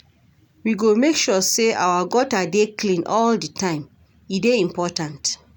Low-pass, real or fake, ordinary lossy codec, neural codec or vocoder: 19.8 kHz; real; none; none